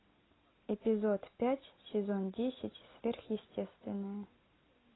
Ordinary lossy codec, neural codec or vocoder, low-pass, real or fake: AAC, 16 kbps; none; 7.2 kHz; real